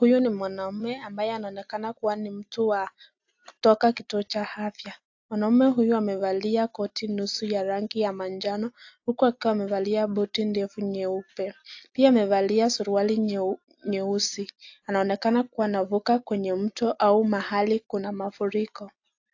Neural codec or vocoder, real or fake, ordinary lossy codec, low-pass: none; real; AAC, 48 kbps; 7.2 kHz